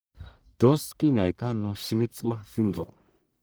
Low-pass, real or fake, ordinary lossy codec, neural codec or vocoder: none; fake; none; codec, 44.1 kHz, 1.7 kbps, Pupu-Codec